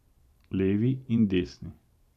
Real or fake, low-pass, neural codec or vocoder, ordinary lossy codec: fake; 14.4 kHz; vocoder, 44.1 kHz, 128 mel bands every 512 samples, BigVGAN v2; none